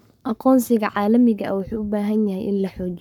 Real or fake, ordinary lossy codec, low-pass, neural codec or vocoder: fake; none; 19.8 kHz; codec, 44.1 kHz, 7.8 kbps, Pupu-Codec